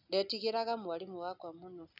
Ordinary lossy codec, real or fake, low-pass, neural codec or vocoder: none; real; 5.4 kHz; none